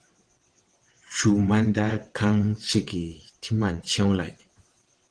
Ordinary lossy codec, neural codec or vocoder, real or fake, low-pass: Opus, 16 kbps; vocoder, 22.05 kHz, 80 mel bands, WaveNeXt; fake; 9.9 kHz